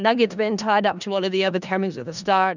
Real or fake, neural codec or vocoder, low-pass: fake; codec, 16 kHz in and 24 kHz out, 0.4 kbps, LongCat-Audio-Codec, four codebook decoder; 7.2 kHz